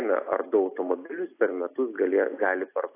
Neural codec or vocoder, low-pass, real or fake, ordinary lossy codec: none; 3.6 kHz; real; AAC, 24 kbps